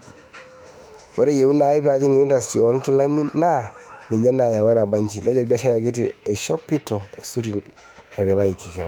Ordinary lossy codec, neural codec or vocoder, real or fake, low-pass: none; autoencoder, 48 kHz, 32 numbers a frame, DAC-VAE, trained on Japanese speech; fake; 19.8 kHz